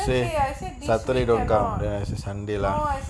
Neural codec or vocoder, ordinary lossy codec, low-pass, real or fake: none; none; none; real